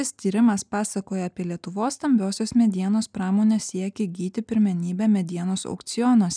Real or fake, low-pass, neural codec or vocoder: real; 9.9 kHz; none